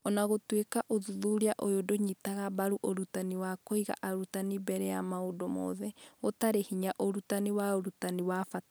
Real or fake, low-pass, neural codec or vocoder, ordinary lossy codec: real; none; none; none